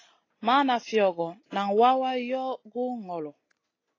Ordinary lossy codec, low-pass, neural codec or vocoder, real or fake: AAC, 32 kbps; 7.2 kHz; none; real